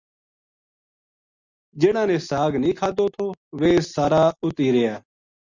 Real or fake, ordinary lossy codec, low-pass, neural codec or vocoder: real; Opus, 64 kbps; 7.2 kHz; none